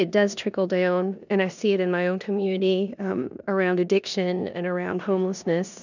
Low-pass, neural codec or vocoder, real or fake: 7.2 kHz; codec, 16 kHz in and 24 kHz out, 0.9 kbps, LongCat-Audio-Codec, four codebook decoder; fake